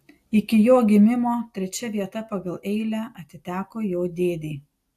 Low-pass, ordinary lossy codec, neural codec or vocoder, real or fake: 14.4 kHz; AAC, 64 kbps; none; real